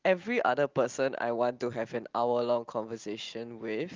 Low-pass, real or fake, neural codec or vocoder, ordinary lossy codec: 7.2 kHz; real; none; Opus, 32 kbps